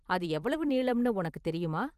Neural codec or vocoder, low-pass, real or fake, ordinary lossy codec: vocoder, 44.1 kHz, 128 mel bands every 256 samples, BigVGAN v2; 14.4 kHz; fake; Opus, 24 kbps